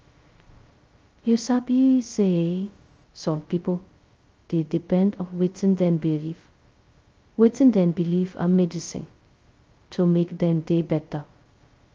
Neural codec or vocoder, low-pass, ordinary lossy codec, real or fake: codec, 16 kHz, 0.2 kbps, FocalCodec; 7.2 kHz; Opus, 32 kbps; fake